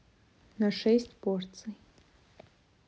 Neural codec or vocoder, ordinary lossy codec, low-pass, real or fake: none; none; none; real